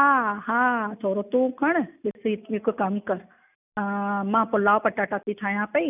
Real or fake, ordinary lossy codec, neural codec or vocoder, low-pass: real; none; none; 3.6 kHz